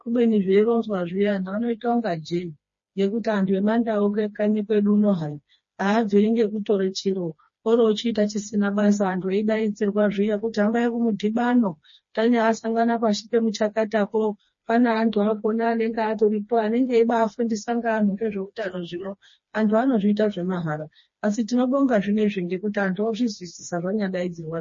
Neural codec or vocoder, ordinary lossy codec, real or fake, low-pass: codec, 16 kHz, 2 kbps, FreqCodec, smaller model; MP3, 32 kbps; fake; 7.2 kHz